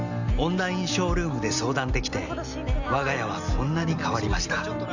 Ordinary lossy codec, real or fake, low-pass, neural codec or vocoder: none; real; 7.2 kHz; none